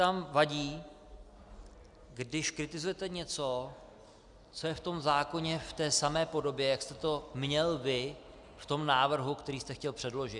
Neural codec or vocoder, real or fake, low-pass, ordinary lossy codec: none; real; 10.8 kHz; Opus, 64 kbps